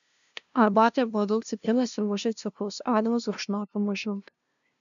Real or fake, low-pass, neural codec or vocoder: fake; 7.2 kHz; codec, 16 kHz, 0.5 kbps, FunCodec, trained on LibriTTS, 25 frames a second